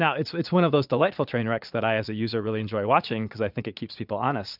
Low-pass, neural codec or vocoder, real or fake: 5.4 kHz; vocoder, 22.05 kHz, 80 mel bands, Vocos; fake